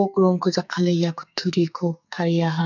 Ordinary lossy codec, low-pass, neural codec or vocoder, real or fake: none; 7.2 kHz; codec, 44.1 kHz, 2.6 kbps, SNAC; fake